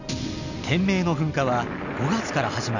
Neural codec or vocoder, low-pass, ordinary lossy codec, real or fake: none; 7.2 kHz; none; real